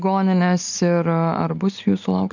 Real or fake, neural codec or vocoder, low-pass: real; none; 7.2 kHz